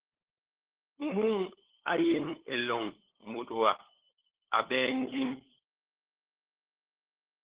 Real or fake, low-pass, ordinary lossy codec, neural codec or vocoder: fake; 3.6 kHz; Opus, 16 kbps; codec, 16 kHz, 8 kbps, FunCodec, trained on LibriTTS, 25 frames a second